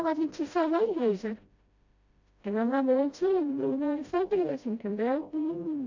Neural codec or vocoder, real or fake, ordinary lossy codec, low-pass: codec, 16 kHz, 0.5 kbps, FreqCodec, smaller model; fake; AAC, 48 kbps; 7.2 kHz